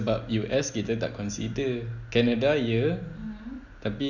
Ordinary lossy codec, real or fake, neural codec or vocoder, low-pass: none; real; none; 7.2 kHz